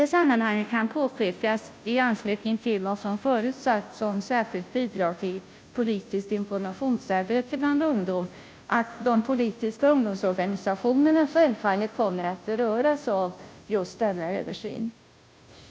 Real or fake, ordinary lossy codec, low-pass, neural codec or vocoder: fake; none; none; codec, 16 kHz, 0.5 kbps, FunCodec, trained on Chinese and English, 25 frames a second